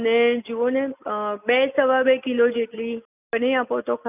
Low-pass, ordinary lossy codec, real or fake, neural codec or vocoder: 3.6 kHz; none; real; none